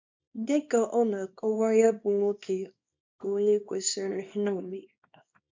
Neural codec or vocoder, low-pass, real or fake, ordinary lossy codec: codec, 24 kHz, 0.9 kbps, WavTokenizer, small release; 7.2 kHz; fake; MP3, 48 kbps